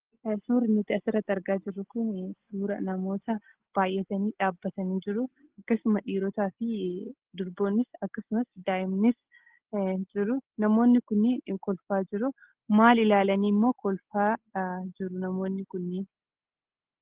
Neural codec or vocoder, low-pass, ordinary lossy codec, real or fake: none; 3.6 kHz; Opus, 16 kbps; real